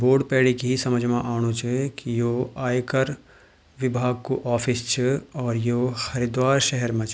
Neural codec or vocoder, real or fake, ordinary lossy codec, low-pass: none; real; none; none